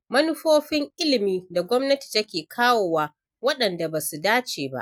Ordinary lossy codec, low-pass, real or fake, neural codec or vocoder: none; 19.8 kHz; real; none